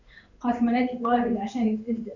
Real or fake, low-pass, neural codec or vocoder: fake; 7.2 kHz; codec, 16 kHz in and 24 kHz out, 1 kbps, XY-Tokenizer